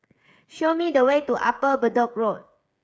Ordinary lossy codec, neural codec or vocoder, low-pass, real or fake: none; codec, 16 kHz, 8 kbps, FreqCodec, smaller model; none; fake